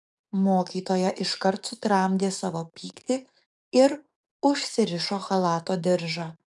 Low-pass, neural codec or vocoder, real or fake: 10.8 kHz; codec, 44.1 kHz, 7.8 kbps, DAC; fake